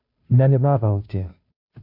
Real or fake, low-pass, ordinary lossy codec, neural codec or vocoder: fake; 5.4 kHz; none; codec, 16 kHz, 0.5 kbps, FunCodec, trained on Chinese and English, 25 frames a second